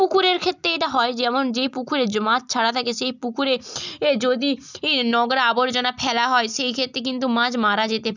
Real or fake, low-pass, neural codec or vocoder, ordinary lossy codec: real; 7.2 kHz; none; none